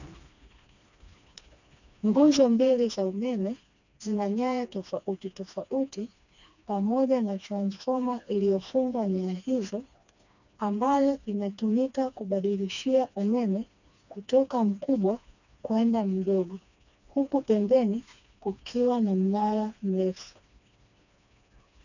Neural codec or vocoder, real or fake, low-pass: codec, 16 kHz, 2 kbps, FreqCodec, smaller model; fake; 7.2 kHz